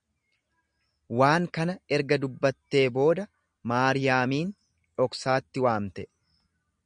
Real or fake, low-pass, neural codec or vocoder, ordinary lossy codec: real; 9.9 kHz; none; MP3, 96 kbps